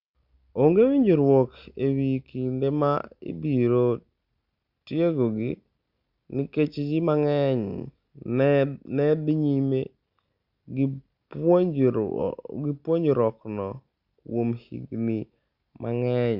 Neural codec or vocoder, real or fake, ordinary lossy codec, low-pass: none; real; Opus, 64 kbps; 5.4 kHz